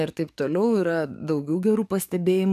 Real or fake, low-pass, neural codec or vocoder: fake; 14.4 kHz; codec, 44.1 kHz, 7.8 kbps, DAC